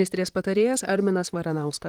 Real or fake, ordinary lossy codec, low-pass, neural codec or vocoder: fake; Opus, 24 kbps; 19.8 kHz; vocoder, 44.1 kHz, 128 mel bands, Pupu-Vocoder